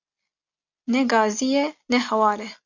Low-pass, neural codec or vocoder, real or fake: 7.2 kHz; none; real